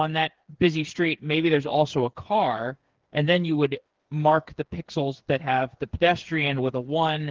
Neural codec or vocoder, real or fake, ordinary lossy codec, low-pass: codec, 16 kHz, 4 kbps, FreqCodec, smaller model; fake; Opus, 16 kbps; 7.2 kHz